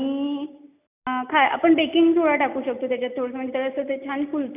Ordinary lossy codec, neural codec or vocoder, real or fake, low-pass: none; none; real; 3.6 kHz